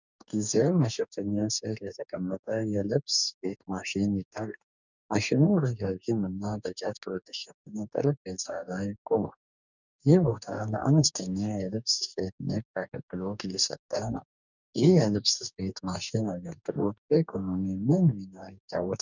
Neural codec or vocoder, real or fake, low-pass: codec, 44.1 kHz, 2.6 kbps, DAC; fake; 7.2 kHz